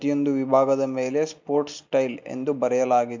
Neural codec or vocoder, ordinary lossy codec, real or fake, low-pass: none; MP3, 64 kbps; real; 7.2 kHz